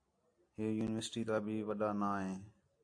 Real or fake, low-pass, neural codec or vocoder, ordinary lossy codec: real; 9.9 kHz; none; AAC, 48 kbps